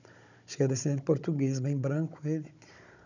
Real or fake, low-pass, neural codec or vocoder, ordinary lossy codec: fake; 7.2 kHz; codec, 16 kHz, 16 kbps, FunCodec, trained on Chinese and English, 50 frames a second; none